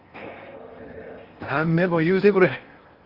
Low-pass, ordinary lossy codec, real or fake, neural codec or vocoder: 5.4 kHz; Opus, 32 kbps; fake; codec, 16 kHz in and 24 kHz out, 0.8 kbps, FocalCodec, streaming, 65536 codes